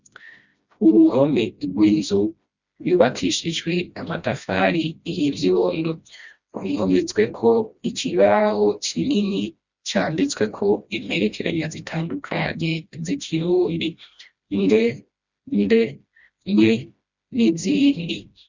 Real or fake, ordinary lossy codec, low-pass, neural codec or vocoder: fake; Opus, 64 kbps; 7.2 kHz; codec, 16 kHz, 1 kbps, FreqCodec, smaller model